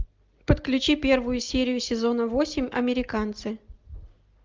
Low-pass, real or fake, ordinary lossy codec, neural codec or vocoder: 7.2 kHz; real; Opus, 32 kbps; none